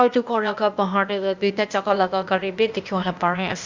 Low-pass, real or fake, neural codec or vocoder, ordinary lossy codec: 7.2 kHz; fake; codec, 16 kHz, 0.8 kbps, ZipCodec; Opus, 64 kbps